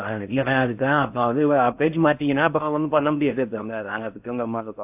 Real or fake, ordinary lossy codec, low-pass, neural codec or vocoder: fake; AAC, 32 kbps; 3.6 kHz; codec, 16 kHz in and 24 kHz out, 0.6 kbps, FocalCodec, streaming, 4096 codes